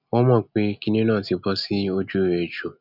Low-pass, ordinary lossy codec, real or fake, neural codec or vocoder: 5.4 kHz; none; real; none